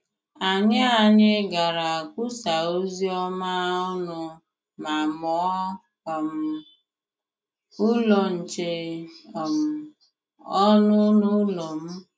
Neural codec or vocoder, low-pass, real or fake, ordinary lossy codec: none; none; real; none